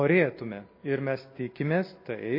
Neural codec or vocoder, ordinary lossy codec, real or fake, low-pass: none; MP3, 24 kbps; real; 5.4 kHz